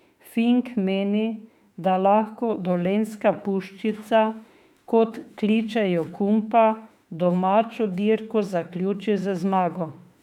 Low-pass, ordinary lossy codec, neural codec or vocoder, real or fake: 19.8 kHz; none; autoencoder, 48 kHz, 32 numbers a frame, DAC-VAE, trained on Japanese speech; fake